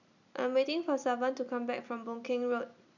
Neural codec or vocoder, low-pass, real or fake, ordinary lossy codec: none; 7.2 kHz; real; none